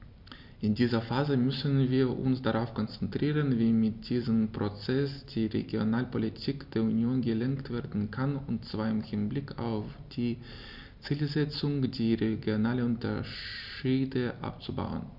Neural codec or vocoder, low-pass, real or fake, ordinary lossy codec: none; 5.4 kHz; real; none